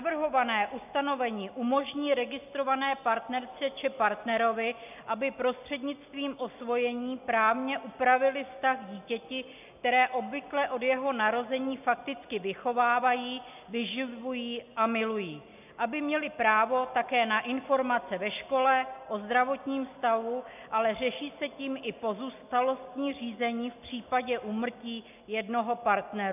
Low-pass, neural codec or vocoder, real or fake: 3.6 kHz; none; real